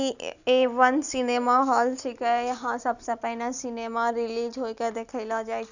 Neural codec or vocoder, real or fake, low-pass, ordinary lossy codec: none; real; 7.2 kHz; none